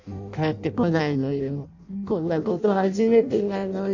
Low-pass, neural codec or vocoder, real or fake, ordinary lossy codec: 7.2 kHz; codec, 16 kHz in and 24 kHz out, 0.6 kbps, FireRedTTS-2 codec; fake; none